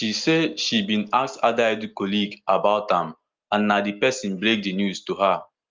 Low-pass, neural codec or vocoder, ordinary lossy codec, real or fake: 7.2 kHz; none; Opus, 32 kbps; real